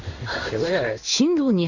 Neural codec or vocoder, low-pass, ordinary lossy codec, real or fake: codec, 16 kHz in and 24 kHz out, 1 kbps, XY-Tokenizer; 7.2 kHz; none; fake